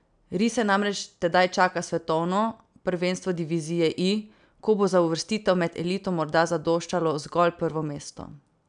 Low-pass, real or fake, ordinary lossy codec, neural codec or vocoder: 9.9 kHz; real; none; none